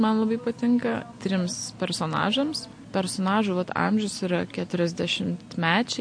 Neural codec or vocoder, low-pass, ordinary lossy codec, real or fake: none; 9.9 kHz; MP3, 48 kbps; real